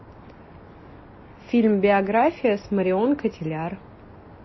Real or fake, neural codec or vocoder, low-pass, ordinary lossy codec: real; none; 7.2 kHz; MP3, 24 kbps